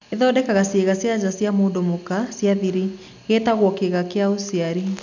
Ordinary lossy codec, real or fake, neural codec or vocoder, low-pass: none; real; none; 7.2 kHz